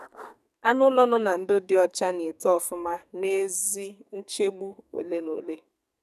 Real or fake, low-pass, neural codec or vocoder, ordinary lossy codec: fake; 14.4 kHz; codec, 44.1 kHz, 2.6 kbps, SNAC; none